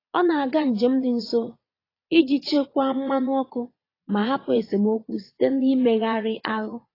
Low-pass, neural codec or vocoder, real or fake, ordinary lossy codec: 5.4 kHz; vocoder, 22.05 kHz, 80 mel bands, Vocos; fake; AAC, 24 kbps